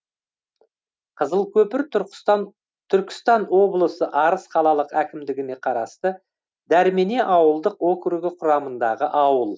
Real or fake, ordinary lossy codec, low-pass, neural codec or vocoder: real; none; none; none